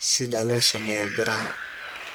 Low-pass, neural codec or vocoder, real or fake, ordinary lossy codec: none; codec, 44.1 kHz, 1.7 kbps, Pupu-Codec; fake; none